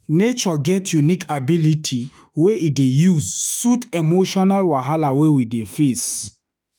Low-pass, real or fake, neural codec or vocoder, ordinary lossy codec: none; fake; autoencoder, 48 kHz, 32 numbers a frame, DAC-VAE, trained on Japanese speech; none